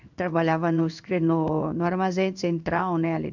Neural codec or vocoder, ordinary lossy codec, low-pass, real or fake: codec, 16 kHz in and 24 kHz out, 1 kbps, XY-Tokenizer; none; 7.2 kHz; fake